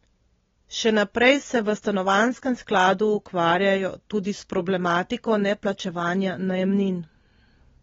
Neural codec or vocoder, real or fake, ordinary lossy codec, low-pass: none; real; AAC, 24 kbps; 7.2 kHz